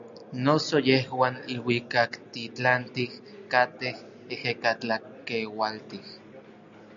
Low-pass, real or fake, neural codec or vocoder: 7.2 kHz; real; none